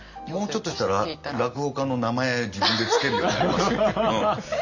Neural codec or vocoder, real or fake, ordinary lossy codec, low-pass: none; real; none; 7.2 kHz